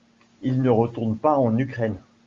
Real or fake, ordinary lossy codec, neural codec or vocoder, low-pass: real; Opus, 32 kbps; none; 7.2 kHz